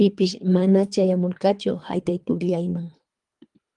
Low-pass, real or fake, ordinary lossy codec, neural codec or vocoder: 10.8 kHz; fake; Opus, 32 kbps; codec, 24 kHz, 3 kbps, HILCodec